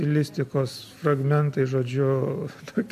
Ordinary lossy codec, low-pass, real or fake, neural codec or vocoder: MP3, 64 kbps; 14.4 kHz; real; none